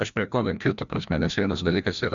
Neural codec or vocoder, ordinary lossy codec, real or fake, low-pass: codec, 16 kHz, 1 kbps, FreqCodec, larger model; Opus, 64 kbps; fake; 7.2 kHz